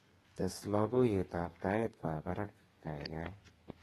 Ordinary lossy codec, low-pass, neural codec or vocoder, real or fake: AAC, 32 kbps; 14.4 kHz; codec, 32 kHz, 1.9 kbps, SNAC; fake